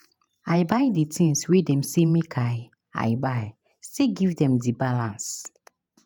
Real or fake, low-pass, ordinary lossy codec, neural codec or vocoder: fake; none; none; vocoder, 48 kHz, 128 mel bands, Vocos